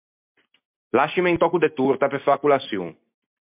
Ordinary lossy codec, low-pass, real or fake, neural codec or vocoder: MP3, 32 kbps; 3.6 kHz; real; none